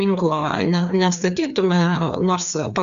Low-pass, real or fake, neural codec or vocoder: 7.2 kHz; fake; codec, 16 kHz, 2 kbps, FreqCodec, larger model